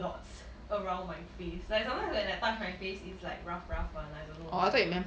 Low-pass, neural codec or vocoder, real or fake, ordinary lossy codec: none; none; real; none